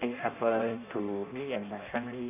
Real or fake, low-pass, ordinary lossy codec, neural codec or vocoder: fake; 3.6 kHz; AAC, 32 kbps; codec, 16 kHz in and 24 kHz out, 0.6 kbps, FireRedTTS-2 codec